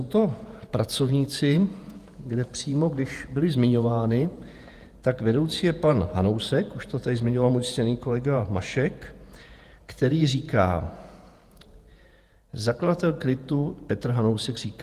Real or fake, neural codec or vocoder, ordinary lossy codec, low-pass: fake; vocoder, 48 kHz, 128 mel bands, Vocos; Opus, 32 kbps; 14.4 kHz